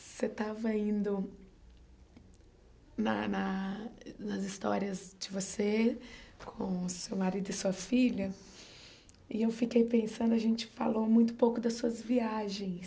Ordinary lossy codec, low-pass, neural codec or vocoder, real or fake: none; none; none; real